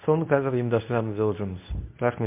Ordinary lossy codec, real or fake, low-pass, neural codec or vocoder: MP3, 32 kbps; fake; 3.6 kHz; codec, 24 kHz, 0.9 kbps, WavTokenizer, medium speech release version 2